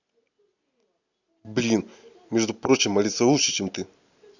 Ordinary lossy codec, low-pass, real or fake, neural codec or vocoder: none; 7.2 kHz; real; none